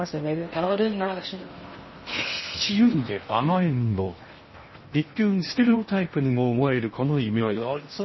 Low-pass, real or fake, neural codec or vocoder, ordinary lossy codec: 7.2 kHz; fake; codec, 16 kHz in and 24 kHz out, 0.6 kbps, FocalCodec, streaming, 4096 codes; MP3, 24 kbps